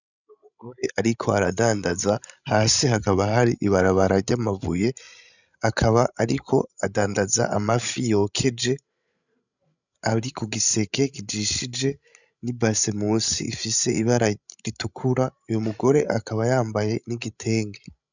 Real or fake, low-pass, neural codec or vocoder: fake; 7.2 kHz; codec, 16 kHz, 16 kbps, FreqCodec, larger model